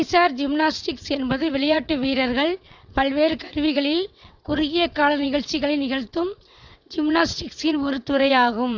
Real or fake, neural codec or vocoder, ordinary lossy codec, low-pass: fake; vocoder, 22.05 kHz, 80 mel bands, WaveNeXt; Opus, 64 kbps; 7.2 kHz